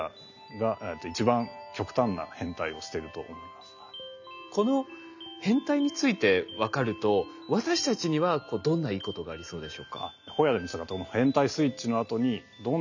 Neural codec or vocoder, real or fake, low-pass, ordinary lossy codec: none; real; 7.2 kHz; none